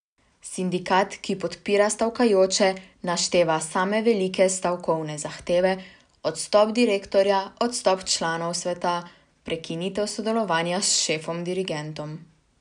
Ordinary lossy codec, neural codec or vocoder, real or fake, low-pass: none; none; real; 9.9 kHz